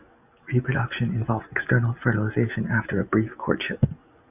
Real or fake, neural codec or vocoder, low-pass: real; none; 3.6 kHz